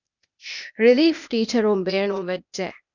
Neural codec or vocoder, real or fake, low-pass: codec, 16 kHz, 0.8 kbps, ZipCodec; fake; 7.2 kHz